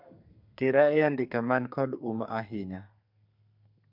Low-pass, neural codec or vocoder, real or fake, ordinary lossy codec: 5.4 kHz; codec, 32 kHz, 1.9 kbps, SNAC; fake; MP3, 48 kbps